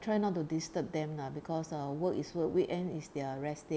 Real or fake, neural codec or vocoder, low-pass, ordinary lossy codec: real; none; none; none